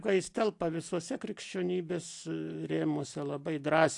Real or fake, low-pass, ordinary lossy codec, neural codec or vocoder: real; 10.8 kHz; AAC, 64 kbps; none